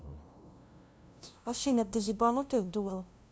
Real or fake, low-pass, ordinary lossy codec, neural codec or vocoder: fake; none; none; codec, 16 kHz, 0.5 kbps, FunCodec, trained on LibriTTS, 25 frames a second